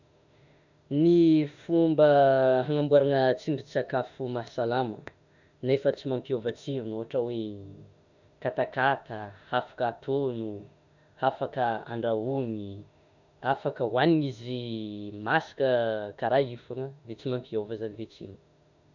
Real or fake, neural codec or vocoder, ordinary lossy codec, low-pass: fake; autoencoder, 48 kHz, 32 numbers a frame, DAC-VAE, trained on Japanese speech; none; 7.2 kHz